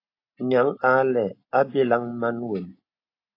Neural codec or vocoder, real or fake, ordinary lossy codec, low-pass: none; real; AAC, 32 kbps; 5.4 kHz